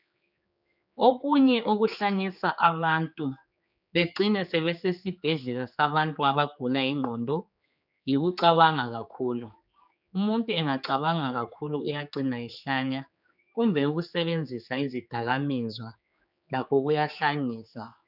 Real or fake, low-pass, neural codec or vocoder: fake; 5.4 kHz; codec, 16 kHz, 4 kbps, X-Codec, HuBERT features, trained on general audio